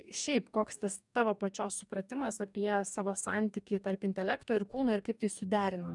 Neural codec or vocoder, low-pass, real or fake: codec, 44.1 kHz, 2.6 kbps, DAC; 10.8 kHz; fake